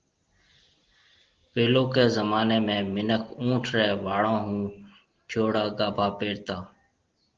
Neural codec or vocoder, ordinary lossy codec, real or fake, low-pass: none; Opus, 16 kbps; real; 7.2 kHz